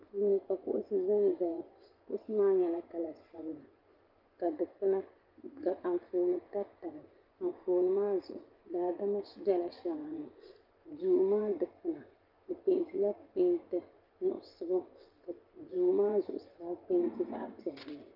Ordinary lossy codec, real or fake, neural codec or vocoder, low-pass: Opus, 32 kbps; fake; vocoder, 24 kHz, 100 mel bands, Vocos; 5.4 kHz